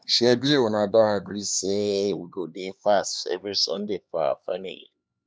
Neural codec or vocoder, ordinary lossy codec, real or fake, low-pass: codec, 16 kHz, 2 kbps, X-Codec, HuBERT features, trained on LibriSpeech; none; fake; none